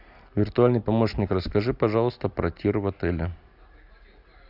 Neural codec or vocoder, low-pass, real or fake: none; 5.4 kHz; real